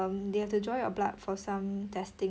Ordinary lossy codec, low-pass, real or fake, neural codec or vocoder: none; none; real; none